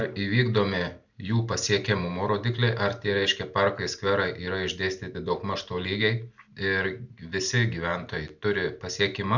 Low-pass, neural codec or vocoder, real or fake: 7.2 kHz; none; real